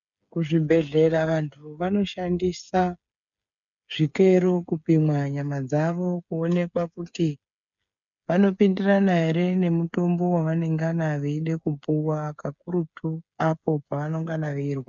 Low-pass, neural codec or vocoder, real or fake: 7.2 kHz; codec, 16 kHz, 8 kbps, FreqCodec, smaller model; fake